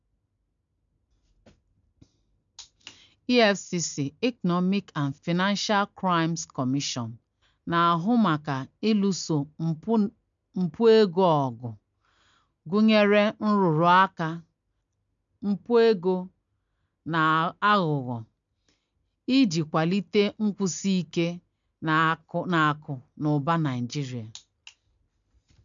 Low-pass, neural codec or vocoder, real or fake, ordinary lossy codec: 7.2 kHz; none; real; MP3, 64 kbps